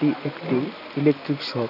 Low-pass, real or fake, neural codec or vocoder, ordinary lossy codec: 5.4 kHz; real; none; none